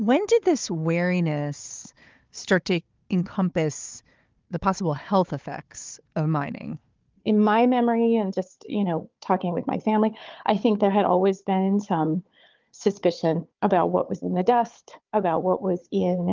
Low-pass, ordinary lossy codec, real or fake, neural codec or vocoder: 7.2 kHz; Opus, 32 kbps; fake; codec, 16 kHz, 4 kbps, FunCodec, trained on Chinese and English, 50 frames a second